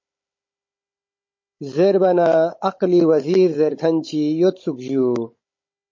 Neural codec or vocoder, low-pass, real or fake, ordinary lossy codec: codec, 16 kHz, 16 kbps, FunCodec, trained on Chinese and English, 50 frames a second; 7.2 kHz; fake; MP3, 32 kbps